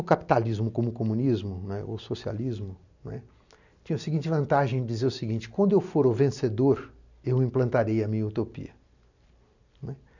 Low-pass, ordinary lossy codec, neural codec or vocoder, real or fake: 7.2 kHz; none; none; real